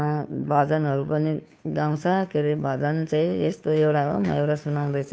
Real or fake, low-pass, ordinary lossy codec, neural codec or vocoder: fake; none; none; codec, 16 kHz, 2 kbps, FunCodec, trained on Chinese and English, 25 frames a second